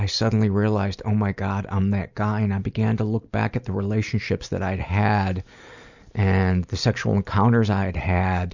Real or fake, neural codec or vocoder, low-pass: real; none; 7.2 kHz